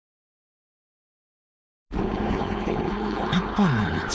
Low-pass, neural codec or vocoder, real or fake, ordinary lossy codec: none; codec, 16 kHz, 4.8 kbps, FACodec; fake; none